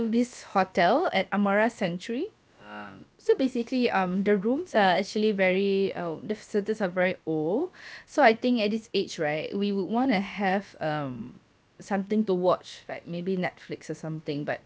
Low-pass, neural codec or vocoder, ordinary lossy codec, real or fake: none; codec, 16 kHz, about 1 kbps, DyCAST, with the encoder's durations; none; fake